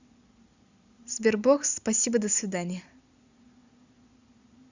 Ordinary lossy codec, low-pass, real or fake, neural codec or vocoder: Opus, 64 kbps; 7.2 kHz; real; none